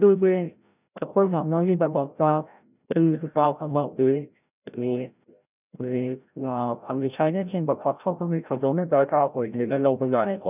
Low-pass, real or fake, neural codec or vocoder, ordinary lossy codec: 3.6 kHz; fake; codec, 16 kHz, 0.5 kbps, FreqCodec, larger model; none